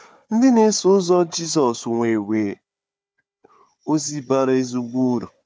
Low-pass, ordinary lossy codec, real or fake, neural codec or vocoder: none; none; fake; codec, 16 kHz, 4 kbps, FunCodec, trained on Chinese and English, 50 frames a second